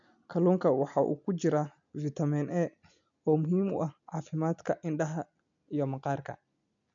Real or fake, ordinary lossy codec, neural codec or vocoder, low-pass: real; none; none; 7.2 kHz